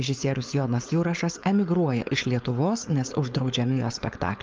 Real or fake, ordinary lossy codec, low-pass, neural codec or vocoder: fake; Opus, 24 kbps; 7.2 kHz; codec, 16 kHz, 4.8 kbps, FACodec